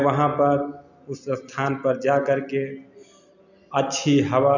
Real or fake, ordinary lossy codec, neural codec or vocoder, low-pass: real; none; none; 7.2 kHz